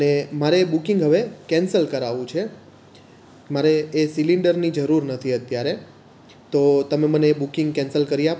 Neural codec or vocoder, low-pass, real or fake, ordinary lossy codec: none; none; real; none